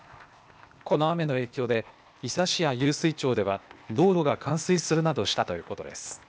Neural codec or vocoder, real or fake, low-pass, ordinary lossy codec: codec, 16 kHz, 0.8 kbps, ZipCodec; fake; none; none